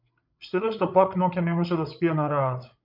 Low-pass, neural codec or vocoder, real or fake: 5.4 kHz; codec, 16 kHz, 8 kbps, FreqCodec, larger model; fake